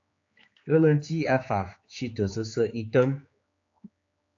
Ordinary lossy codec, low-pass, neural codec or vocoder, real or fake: AAC, 48 kbps; 7.2 kHz; codec, 16 kHz, 4 kbps, X-Codec, HuBERT features, trained on general audio; fake